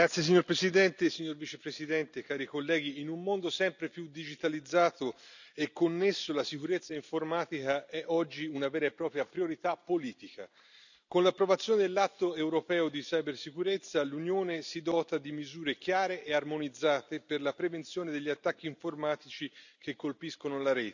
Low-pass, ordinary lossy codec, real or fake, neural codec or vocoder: 7.2 kHz; none; real; none